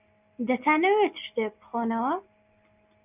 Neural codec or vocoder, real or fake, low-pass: none; real; 3.6 kHz